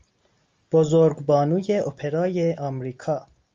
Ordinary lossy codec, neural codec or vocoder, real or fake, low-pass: Opus, 32 kbps; none; real; 7.2 kHz